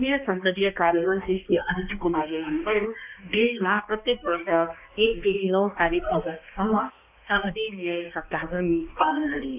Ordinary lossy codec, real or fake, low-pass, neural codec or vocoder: none; fake; 3.6 kHz; codec, 16 kHz, 1 kbps, X-Codec, HuBERT features, trained on balanced general audio